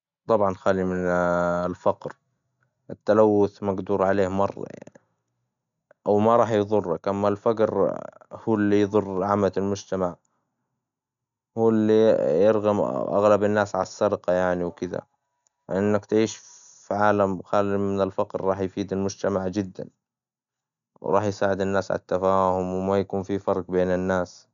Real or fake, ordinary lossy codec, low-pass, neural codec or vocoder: real; none; 7.2 kHz; none